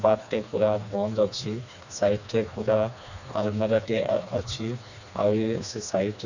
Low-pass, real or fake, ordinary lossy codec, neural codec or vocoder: 7.2 kHz; fake; none; codec, 16 kHz, 2 kbps, FreqCodec, smaller model